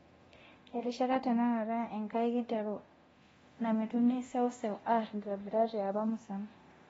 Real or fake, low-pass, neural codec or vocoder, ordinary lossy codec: fake; 10.8 kHz; codec, 24 kHz, 0.9 kbps, DualCodec; AAC, 24 kbps